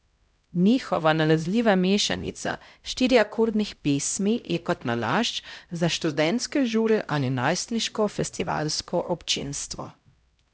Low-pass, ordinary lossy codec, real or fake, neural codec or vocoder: none; none; fake; codec, 16 kHz, 0.5 kbps, X-Codec, HuBERT features, trained on LibriSpeech